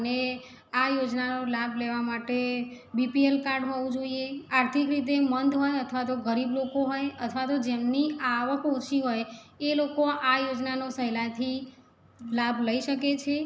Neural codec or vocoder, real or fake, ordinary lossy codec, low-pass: none; real; none; none